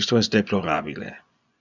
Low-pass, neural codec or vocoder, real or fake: 7.2 kHz; vocoder, 24 kHz, 100 mel bands, Vocos; fake